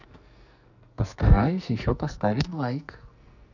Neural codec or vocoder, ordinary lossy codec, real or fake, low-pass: codec, 44.1 kHz, 2.6 kbps, SNAC; none; fake; 7.2 kHz